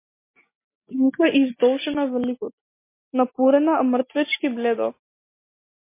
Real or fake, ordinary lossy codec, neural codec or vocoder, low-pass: real; MP3, 24 kbps; none; 3.6 kHz